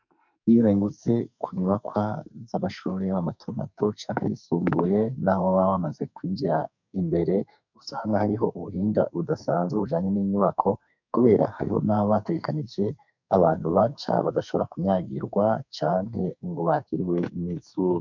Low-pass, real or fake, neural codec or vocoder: 7.2 kHz; fake; codec, 44.1 kHz, 2.6 kbps, SNAC